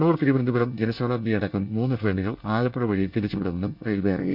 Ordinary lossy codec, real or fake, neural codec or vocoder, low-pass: none; fake; codec, 24 kHz, 1 kbps, SNAC; 5.4 kHz